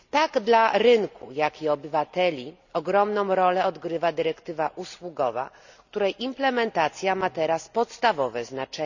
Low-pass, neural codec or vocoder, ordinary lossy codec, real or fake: 7.2 kHz; none; none; real